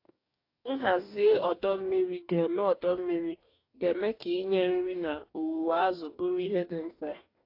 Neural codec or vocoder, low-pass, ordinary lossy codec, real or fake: codec, 44.1 kHz, 2.6 kbps, DAC; 5.4 kHz; AAC, 32 kbps; fake